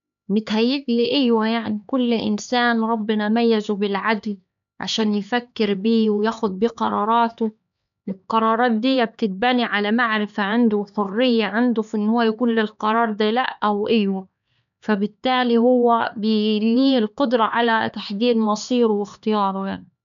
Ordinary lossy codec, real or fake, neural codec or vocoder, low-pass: none; fake; codec, 16 kHz, 4 kbps, X-Codec, HuBERT features, trained on LibriSpeech; 7.2 kHz